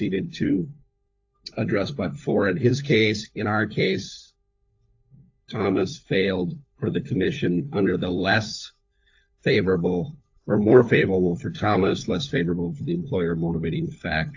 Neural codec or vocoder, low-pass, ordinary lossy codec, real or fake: codec, 16 kHz, 4 kbps, FunCodec, trained on LibriTTS, 50 frames a second; 7.2 kHz; AAC, 48 kbps; fake